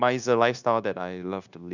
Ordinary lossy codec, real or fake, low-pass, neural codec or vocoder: none; fake; 7.2 kHz; codec, 16 kHz, 0.9 kbps, LongCat-Audio-Codec